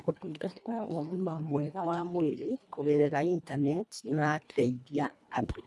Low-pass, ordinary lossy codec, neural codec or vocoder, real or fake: none; none; codec, 24 kHz, 1.5 kbps, HILCodec; fake